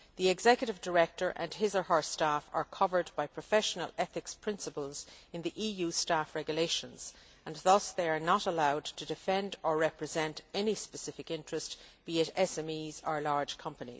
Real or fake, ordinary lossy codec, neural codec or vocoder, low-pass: real; none; none; none